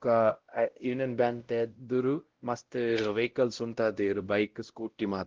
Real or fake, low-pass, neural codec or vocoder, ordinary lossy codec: fake; 7.2 kHz; codec, 16 kHz, 0.5 kbps, X-Codec, WavLM features, trained on Multilingual LibriSpeech; Opus, 16 kbps